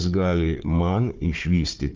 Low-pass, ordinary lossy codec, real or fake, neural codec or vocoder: 7.2 kHz; Opus, 32 kbps; fake; codec, 16 kHz, 4 kbps, FunCodec, trained on Chinese and English, 50 frames a second